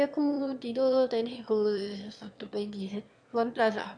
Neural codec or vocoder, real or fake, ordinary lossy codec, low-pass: autoencoder, 22.05 kHz, a latent of 192 numbers a frame, VITS, trained on one speaker; fake; MP3, 64 kbps; 9.9 kHz